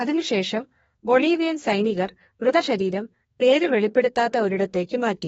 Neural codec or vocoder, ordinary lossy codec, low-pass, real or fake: codec, 32 kHz, 1.9 kbps, SNAC; AAC, 24 kbps; 14.4 kHz; fake